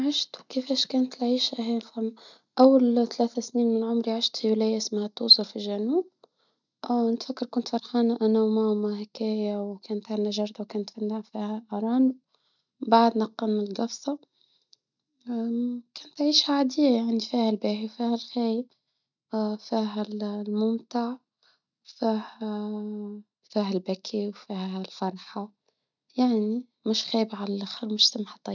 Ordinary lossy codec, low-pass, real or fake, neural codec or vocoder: none; 7.2 kHz; real; none